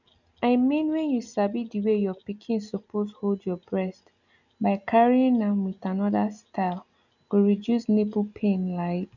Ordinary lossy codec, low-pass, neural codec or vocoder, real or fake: none; 7.2 kHz; none; real